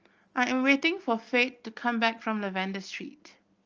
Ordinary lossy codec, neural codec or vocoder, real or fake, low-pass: Opus, 24 kbps; none; real; 7.2 kHz